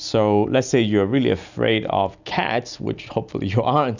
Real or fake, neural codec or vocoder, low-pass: real; none; 7.2 kHz